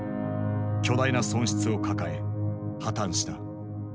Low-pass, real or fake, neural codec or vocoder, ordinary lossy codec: none; real; none; none